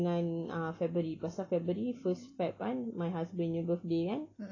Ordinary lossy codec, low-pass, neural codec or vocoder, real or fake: AAC, 32 kbps; 7.2 kHz; none; real